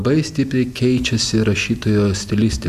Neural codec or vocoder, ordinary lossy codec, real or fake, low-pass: none; Opus, 64 kbps; real; 14.4 kHz